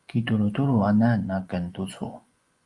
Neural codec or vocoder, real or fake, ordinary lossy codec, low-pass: vocoder, 24 kHz, 100 mel bands, Vocos; fake; Opus, 32 kbps; 10.8 kHz